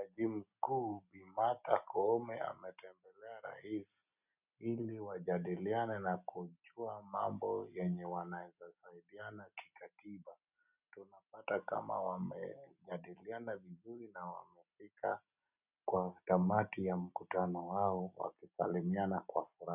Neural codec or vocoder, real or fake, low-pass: none; real; 3.6 kHz